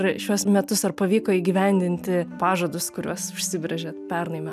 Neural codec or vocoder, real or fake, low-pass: none; real; 14.4 kHz